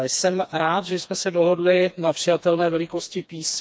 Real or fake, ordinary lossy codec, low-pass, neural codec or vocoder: fake; none; none; codec, 16 kHz, 2 kbps, FreqCodec, smaller model